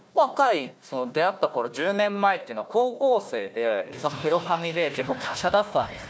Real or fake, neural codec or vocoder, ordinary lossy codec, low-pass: fake; codec, 16 kHz, 1 kbps, FunCodec, trained on Chinese and English, 50 frames a second; none; none